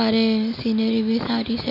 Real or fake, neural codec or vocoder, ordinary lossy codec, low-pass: real; none; none; 5.4 kHz